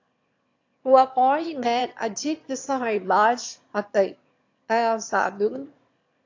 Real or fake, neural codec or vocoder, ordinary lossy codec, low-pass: fake; autoencoder, 22.05 kHz, a latent of 192 numbers a frame, VITS, trained on one speaker; AAC, 48 kbps; 7.2 kHz